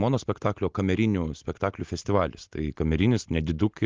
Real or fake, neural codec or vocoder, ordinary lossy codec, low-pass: real; none; Opus, 24 kbps; 7.2 kHz